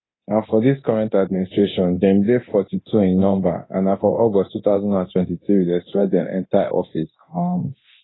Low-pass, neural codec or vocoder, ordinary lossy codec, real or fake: 7.2 kHz; codec, 24 kHz, 0.9 kbps, DualCodec; AAC, 16 kbps; fake